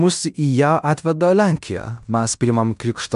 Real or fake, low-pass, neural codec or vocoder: fake; 10.8 kHz; codec, 16 kHz in and 24 kHz out, 0.9 kbps, LongCat-Audio-Codec, fine tuned four codebook decoder